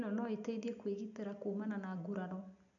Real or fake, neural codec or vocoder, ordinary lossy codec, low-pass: real; none; none; 7.2 kHz